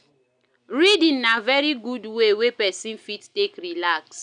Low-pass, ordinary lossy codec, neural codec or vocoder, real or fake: 9.9 kHz; none; none; real